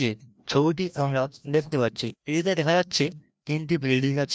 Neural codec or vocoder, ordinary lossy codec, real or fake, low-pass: codec, 16 kHz, 1 kbps, FreqCodec, larger model; none; fake; none